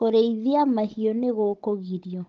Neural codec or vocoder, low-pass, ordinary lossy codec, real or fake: codec, 16 kHz, 16 kbps, FunCodec, trained on Chinese and English, 50 frames a second; 7.2 kHz; Opus, 16 kbps; fake